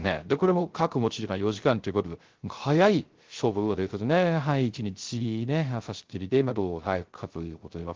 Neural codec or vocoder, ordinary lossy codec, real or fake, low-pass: codec, 16 kHz, 0.3 kbps, FocalCodec; Opus, 16 kbps; fake; 7.2 kHz